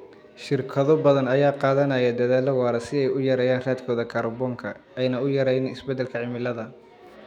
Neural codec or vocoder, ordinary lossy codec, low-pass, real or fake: autoencoder, 48 kHz, 128 numbers a frame, DAC-VAE, trained on Japanese speech; none; 19.8 kHz; fake